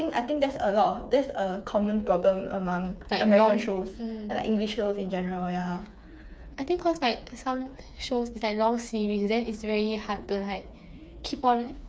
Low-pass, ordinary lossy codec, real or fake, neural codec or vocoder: none; none; fake; codec, 16 kHz, 4 kbps, FreqCodec, smaller model